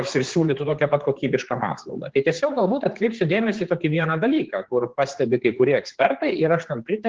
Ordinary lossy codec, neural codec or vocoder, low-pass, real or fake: Opus, 16 kbps; codec, 16 kHz, 4 kbps, X-Codec, HuBERT features, trained on general audio; 7.2 kHz; fake